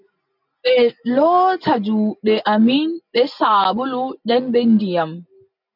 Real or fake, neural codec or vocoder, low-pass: real; none; 5.4 kHz